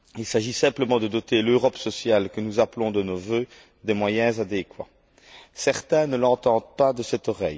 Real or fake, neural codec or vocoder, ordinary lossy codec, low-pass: real; none; none; none